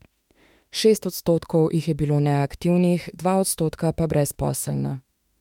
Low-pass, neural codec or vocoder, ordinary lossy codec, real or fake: 19.8 kHz; autoencoder, 48 kHz, 32 numbers a frame, DAC-VAE, trained on Japanese speech; MP3, 96 kbps; fake